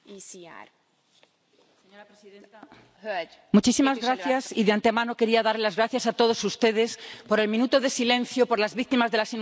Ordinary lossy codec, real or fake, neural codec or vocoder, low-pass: none; real; none; none